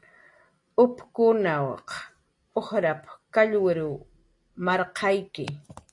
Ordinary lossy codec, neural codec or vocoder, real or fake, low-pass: AAC, 48 kbps; none; real; 10.8 kHz